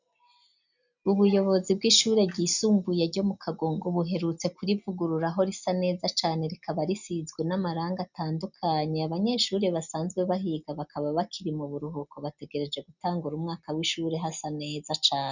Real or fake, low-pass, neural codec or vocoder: real; 7.2 kHz; none